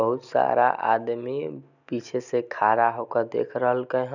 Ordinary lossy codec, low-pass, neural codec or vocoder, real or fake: none; 7.2 kHz; none; real